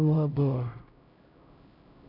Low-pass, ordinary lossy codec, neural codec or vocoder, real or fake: 5.4 kHz; none; codec, 16 kHz, 0.5 kbps, X-Codec, HuBERT features, trained on LibriSpeech; fake